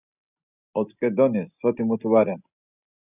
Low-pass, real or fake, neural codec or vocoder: 3.6 kHz; real; none